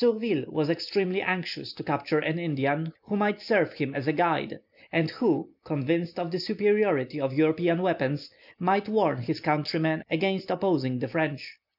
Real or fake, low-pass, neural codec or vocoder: real; 5.4 kHz; none